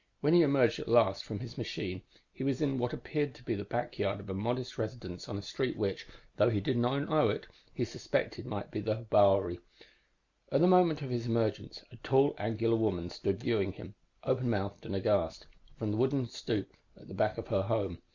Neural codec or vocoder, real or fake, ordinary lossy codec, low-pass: none; real; Opus, 64 kbps; 7.2 kHz